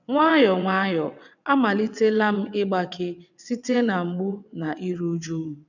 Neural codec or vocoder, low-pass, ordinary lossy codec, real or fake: vocoder, 22.05 kHz, 80 mel bands, WaveNeXt; 7.2 kHz; none; fake